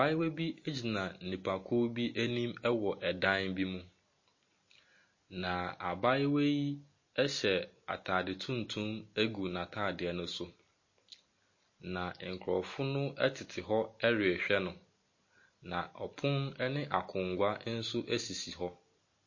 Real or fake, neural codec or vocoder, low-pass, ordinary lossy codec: real; none; 7.2 kHz; MP3, 32 kbps